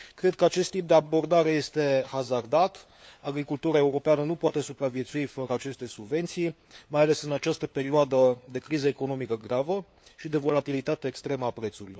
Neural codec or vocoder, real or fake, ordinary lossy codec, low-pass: codec, 16 kHz, 4 kbps, FunCodec, trained on LibriTTS, 50 frames a second; fake; none; none